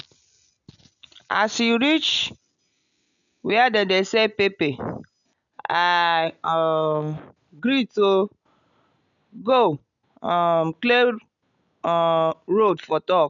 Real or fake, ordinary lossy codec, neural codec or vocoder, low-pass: real; none; none; 7.2 kHz